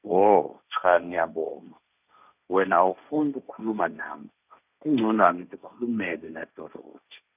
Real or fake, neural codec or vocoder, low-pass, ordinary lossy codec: fake; codec, 16 kHz, 1.1 kbps, Voila-Tokenizer; 3.6 kHz; none